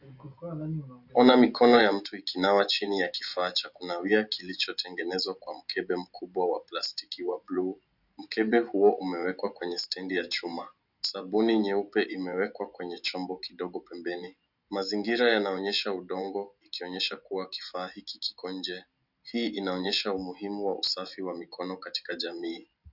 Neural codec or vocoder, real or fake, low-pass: none; real; 5.4 kHz